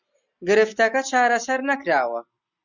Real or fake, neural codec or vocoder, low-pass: real; none; 7.2 kHz